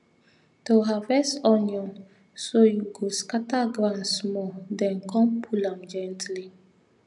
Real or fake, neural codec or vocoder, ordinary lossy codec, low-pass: real; none; none; 10.8 kHz